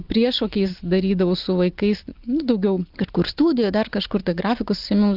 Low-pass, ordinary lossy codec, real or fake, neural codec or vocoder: 5.4 kHz; Opus, 24 kbps; real; none